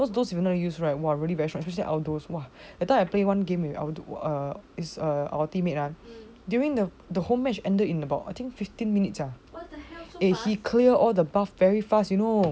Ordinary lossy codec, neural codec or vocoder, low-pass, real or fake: none; none; none; real